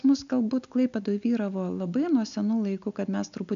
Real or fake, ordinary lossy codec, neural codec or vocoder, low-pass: real; AAC, 96 kbps; none; 7.2 kHz